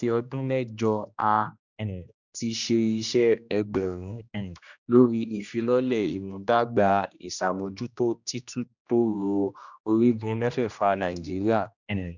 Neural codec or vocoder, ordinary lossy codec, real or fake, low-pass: codec, 16 kHz, 1 kbps, X-Codec, HuBERT features, trained on general audio; none; fake; 7.2 kHz